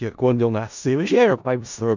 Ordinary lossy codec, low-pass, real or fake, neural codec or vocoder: none; 7.2 kHz; fake; codec, 16 kHz in and 24 kHz out, 0.4 kbps, LongCat-Audio-Codec, four codebook decoder